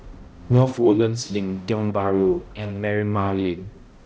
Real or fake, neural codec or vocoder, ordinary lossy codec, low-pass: fake; codec, 16 kHz, 0.5 kbps, X-Codec, HuBERT features, trained on balanced general audio; none; none